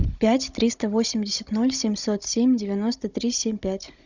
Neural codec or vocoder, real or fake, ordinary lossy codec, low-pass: codec, 16 kHz, 16 kbps, FunCodec, trained on Chinese and English, 50 frames a second; fake; Opus, 64 kbps; 7.2 kHz